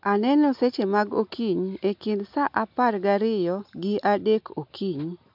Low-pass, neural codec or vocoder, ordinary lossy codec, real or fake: 5.4 kHz; none; MP3, 48 kbps; real